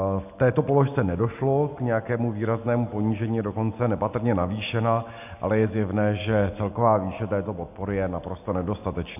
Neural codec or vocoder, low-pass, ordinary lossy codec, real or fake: none; 3.6 kHz; AAC, 32 kbps; real